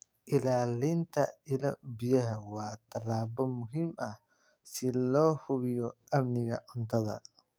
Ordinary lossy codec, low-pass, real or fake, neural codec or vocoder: none; none; fake; codec, 44.1 kHz, 7.8 kbps, DAC